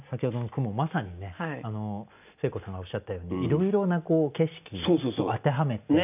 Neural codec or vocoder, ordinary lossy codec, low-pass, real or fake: none; none; 3.6 kHz; real